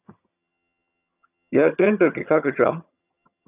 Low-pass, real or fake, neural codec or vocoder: 3.6 kHz; fake; vocoder, 22.05 kHz, 80 mel bands, HiFi-GAN